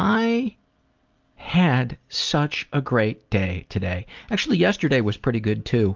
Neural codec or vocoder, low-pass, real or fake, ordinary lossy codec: none; 7.2 kHz; real; Opus, 24 kbps